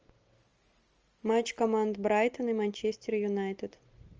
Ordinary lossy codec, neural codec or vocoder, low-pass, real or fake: Opus, 24 kbps; none; 7.2 kHz; real